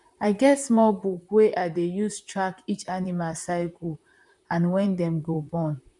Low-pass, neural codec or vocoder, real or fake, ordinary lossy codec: 10.8 kHz; vocoder, 44.1 kHz, 128 mel bands, Pupu-Vocoder; fake; none